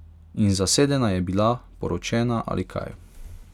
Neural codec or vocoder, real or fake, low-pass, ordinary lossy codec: none; real; 19.8 kHz; none